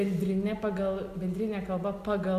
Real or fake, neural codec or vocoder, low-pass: real; none; 14.4 kHz